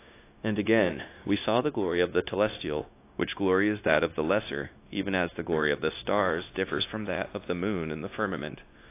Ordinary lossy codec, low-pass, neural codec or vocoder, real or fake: AAC, 24 kbps; 3.6 kHz; codec, 16 kHz, 0.9 kbps, LongCat-Audio-Codec; fake